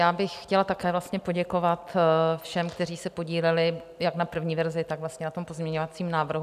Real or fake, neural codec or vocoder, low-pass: real; none; 14.4 kHz